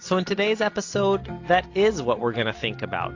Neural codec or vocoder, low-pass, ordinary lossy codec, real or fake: none; 7.2 kHz; AAC, 48 kbps; real